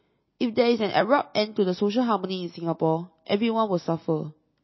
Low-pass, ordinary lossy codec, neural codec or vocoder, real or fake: 7.2 kHz; MP3, 24 kbps; none; real